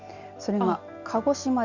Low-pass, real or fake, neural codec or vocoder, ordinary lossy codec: 7.2 kHz; real; none; Opus, 64 kbps